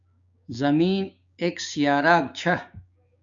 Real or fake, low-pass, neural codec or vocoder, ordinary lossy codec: fake; 7.2 kHz; codec, 16 kHz, 6 kbps, DAC; AAC, 64 kbps